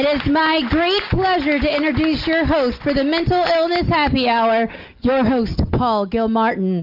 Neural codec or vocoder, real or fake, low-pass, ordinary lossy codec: none; real; 5.4 kHz; Opus, 24 kbps